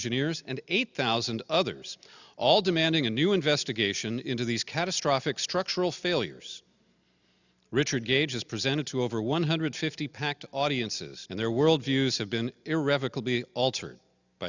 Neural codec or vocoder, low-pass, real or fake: none; 7.2 kHz; real